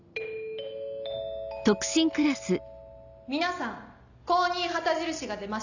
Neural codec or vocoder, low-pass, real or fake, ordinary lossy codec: none; 7.2 kHz; real; none